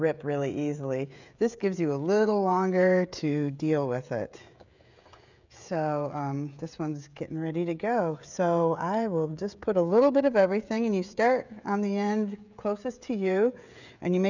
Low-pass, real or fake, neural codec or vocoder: 7.2 kHz; fake; codec, 16 kHz, 16 kbps, FreqCodec, smaller model